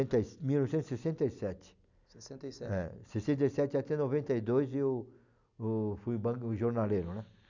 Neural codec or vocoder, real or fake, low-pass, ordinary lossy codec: none; real; 7.2 kHz; none